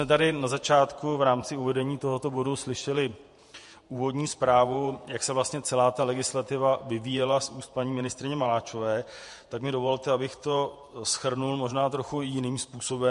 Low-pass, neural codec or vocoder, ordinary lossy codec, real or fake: 14.4 kHz; vocoder, 48 kHz, 128 mel bands, Vocos; MP3, 48 kbps; fake